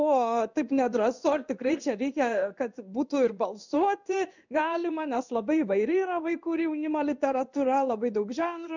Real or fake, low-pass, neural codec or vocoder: fake; 7.2 kHz; codec, 16 kHz in and 24 kHz out, 1 kbps, XY-Tokenizer